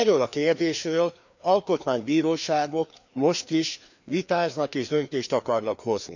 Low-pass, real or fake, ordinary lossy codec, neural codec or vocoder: 7.2 kHz; fake; none; codec, 16 kHz, 2 kbps, FreqCodec, larger model